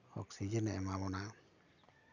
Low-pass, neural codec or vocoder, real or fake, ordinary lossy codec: 7.2 kHz; none; real; none